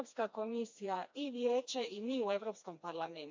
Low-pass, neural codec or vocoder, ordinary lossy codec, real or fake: 7.2 kHz; codec, 16 kHz, 2 kbps, FreqCodec, smaller model; MP3, 64 kbps; fake